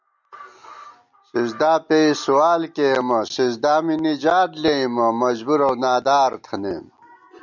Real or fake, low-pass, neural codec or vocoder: real; 7.2 kHz; none